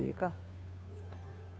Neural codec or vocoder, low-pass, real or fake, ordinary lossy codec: codec, 16 kHz, 2 kbps, FunCodec, trained on Chinese and English, 25 frames a second; none; fake; none